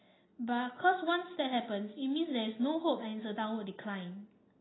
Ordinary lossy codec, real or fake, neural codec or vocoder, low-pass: AAC, 16 kbps; real; none; 7.2 kHz